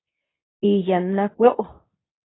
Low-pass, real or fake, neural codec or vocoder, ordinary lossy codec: 7.2 kHz; fake; codec, 24 kHz, 0.9 kbps, WavTokenizer, medium speech release version 2; AAC, 16 kbps